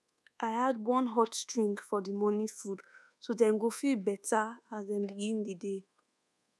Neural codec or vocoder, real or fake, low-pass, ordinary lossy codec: codec, 24 kHz, 1.2 kbps, DualCodec; fake; none; none